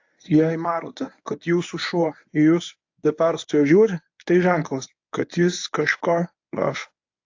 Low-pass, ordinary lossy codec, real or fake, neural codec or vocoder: 7.2 kHz; AAC, 48 kbps; fake; codec, 24 kHz, 0.9 kbps, WavTokenizer, medium speech release version 1